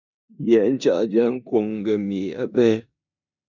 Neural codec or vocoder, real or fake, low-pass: codec, 16 kHz in and 24 kHz out, 0.9 kbps, LongCat-Audio-Codec, four codebook decoder; fake; 7.2 kHz